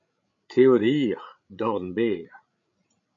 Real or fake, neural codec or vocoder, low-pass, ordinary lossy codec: fake; codec, 16 kHz, 16 kbps, FreqCodec, larger model; 7.2 kHz; AAC, 64 kbps